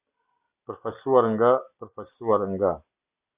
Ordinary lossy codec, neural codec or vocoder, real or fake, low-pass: Opus, 64 kbps; none; real; 3.6 kHz